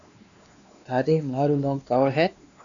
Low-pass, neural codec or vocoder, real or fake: 7.2 kHz; codec, 16 kHz, 2 kbps, X-Codec, WavLM features, trained on Multilingual LibriSpeech; fake